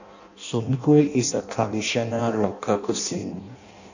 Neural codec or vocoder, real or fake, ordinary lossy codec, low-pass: codec, 16 kHz in and 24 kHz out, 0.6 kbps, FireRedTTS-2 codec; fake; none; 7.2 kHz